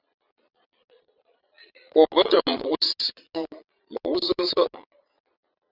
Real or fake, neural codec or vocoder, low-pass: fake; vocoder, 22.05 kHz, 80 mel bands, Vocos; 5.4 kHz